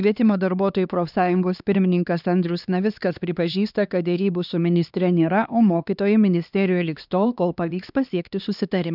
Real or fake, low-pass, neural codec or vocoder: fake; 5.4 kHz; codec, 16 kHz, 2 kbps, X-Codec, HuBERT features, trained on LibriSpeech